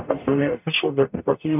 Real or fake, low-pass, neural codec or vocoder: fake; 3.6 kHz; codec, 44.1 kHz, 0.9 kbps, DAC